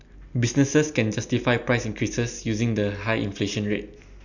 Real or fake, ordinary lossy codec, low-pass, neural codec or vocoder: real; none; 7.2 kHz; none